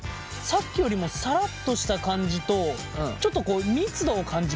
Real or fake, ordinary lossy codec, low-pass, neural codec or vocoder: real; none; none; none